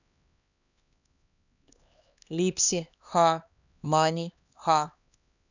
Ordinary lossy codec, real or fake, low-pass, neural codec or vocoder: none; fake; 7.2 kHz; codec, 16 kHz, 2 kbps, X-Codec, HuBERT features, trained on LibriSpeech